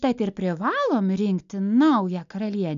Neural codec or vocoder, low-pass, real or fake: none; 7.2 kHz; real